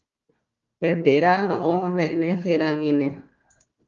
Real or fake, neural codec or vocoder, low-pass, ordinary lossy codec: fake; codec, 16 kHz, 1 kbps, FunCodec, trained on Chinese and English, 50 frames a second; 7.2 kHz; Opus, 32 kbps